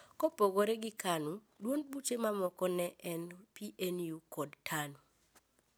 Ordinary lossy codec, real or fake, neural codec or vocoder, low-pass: none; real; none; none